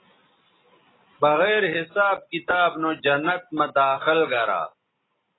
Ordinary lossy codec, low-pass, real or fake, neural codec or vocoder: AAC, 16 kbps; 7.2 kHz; real; none